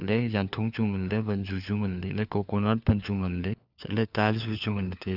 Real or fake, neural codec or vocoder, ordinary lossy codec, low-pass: fake; codec, 16 kHz, 4 kbps, FunCodec, trained on LibriTTS, 50 frames a second; none; 5.4 kHz